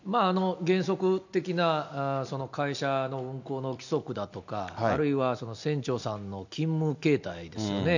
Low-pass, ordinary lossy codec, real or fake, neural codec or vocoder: 7.2 kHz; none; real; none